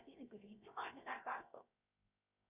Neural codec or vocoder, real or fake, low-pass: codec, 16 kHz, 0.7 kbps, FocalCodec; fake; 3.6 kHz